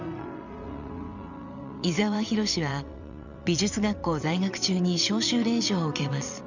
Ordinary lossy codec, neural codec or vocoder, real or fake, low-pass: none; vocoder, 22.05 kHz, 80 mel bands, WaveNeXt; fake; 7.2 kHz